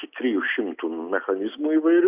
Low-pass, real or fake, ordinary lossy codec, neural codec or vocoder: 3.6 kHz; real; Opus, 64 kbps; none